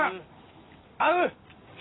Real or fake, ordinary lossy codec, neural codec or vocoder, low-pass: real; AAC, 16 kbps; none; 7.2 kHz